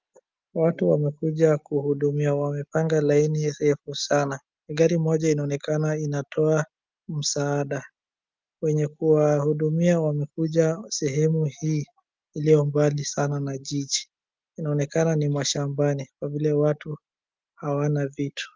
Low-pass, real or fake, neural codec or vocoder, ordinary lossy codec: 7.2 kHz; real; none; Opus, 24 kbps